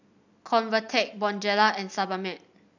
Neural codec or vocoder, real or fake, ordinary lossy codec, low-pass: none; real; none; 7.2 kHz